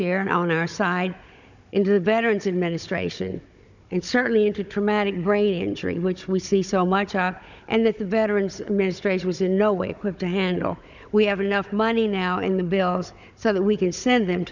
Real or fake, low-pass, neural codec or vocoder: fake; 7.2 kHz; codec, 16 kHz, 16 kbps, FunCodec, trained on Chinese and English, 50 frames a second